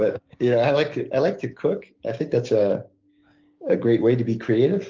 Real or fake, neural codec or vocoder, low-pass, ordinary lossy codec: fake; codec, 16 kHz, 6 kbps, DAC; 7.2 kHz; Opus, 32 kbps